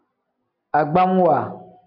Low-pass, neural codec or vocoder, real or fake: 5.4 kHz; none; real